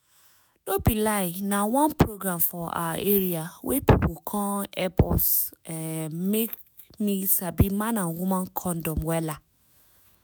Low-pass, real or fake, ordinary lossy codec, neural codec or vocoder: none; fake; none; autoencoder, 48 kHz, 128 numbers a frame, DAC-VAE, trained on Japanese speech